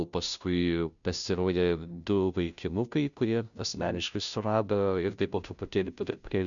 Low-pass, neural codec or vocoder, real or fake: 7.2 kHz; codec, 16 kHz, 0.5 kbps, FunCodec, trained on Chinese and English, 25 frames a second; fake